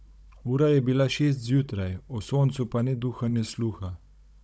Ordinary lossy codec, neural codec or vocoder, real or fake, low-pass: none; codec, 16 kHz, 16 kbps, FunCodec, trained on Chinese and English, 50 frames a second; fake; none